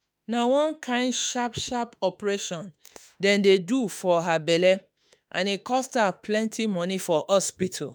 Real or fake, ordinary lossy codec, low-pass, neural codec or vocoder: fake; none; none; autoencoder, 48 kHz, 32 numbers a frame, DAC-VAE, trained on Japanese speech